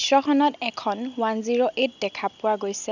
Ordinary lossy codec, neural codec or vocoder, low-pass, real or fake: none; none; 7.2 kHz; real